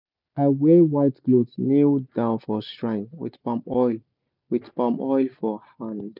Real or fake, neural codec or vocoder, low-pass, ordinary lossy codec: real; none; 5.4 kHz; none